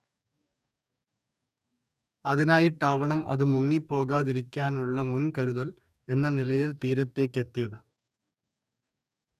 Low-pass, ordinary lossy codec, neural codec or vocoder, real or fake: 14.4 kHz; none; codec, 44.1 kHz, 2.6 kbps, DAC; fake